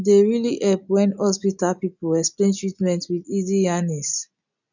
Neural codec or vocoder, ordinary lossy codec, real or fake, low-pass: none; none; real; 7.2 kHz